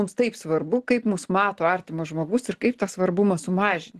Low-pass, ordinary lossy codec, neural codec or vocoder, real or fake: 14.4 kHz; Opus, 16 kbps; none; real